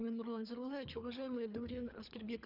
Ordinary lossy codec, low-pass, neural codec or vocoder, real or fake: none; 5.4 kHz; codec, 24 kHz, 3 kbps, HILCodec; fake